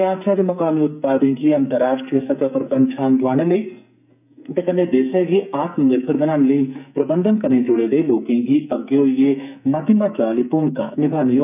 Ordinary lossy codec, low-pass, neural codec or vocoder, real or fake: none; 3.6 kHz; codec, 44.1 kHz, 2.6 kbps, SNAC; fake